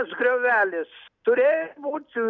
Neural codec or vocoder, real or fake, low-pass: none; real; 7.2 kHz